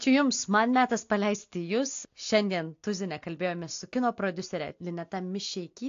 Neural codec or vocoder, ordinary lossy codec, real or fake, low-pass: none; AAC, 48 kbps; real; 7.2 kHz